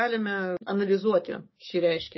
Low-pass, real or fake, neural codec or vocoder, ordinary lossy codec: 7.2 kHz; fake; codec, 44.1 kHz, 7.8 kbps, DAC; MP3, 24 kbps